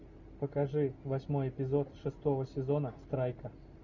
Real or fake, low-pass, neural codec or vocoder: real; 7.2 kHz; none